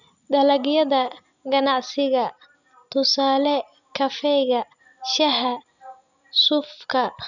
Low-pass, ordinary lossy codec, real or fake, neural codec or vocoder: 7.2 kHz; none; real; none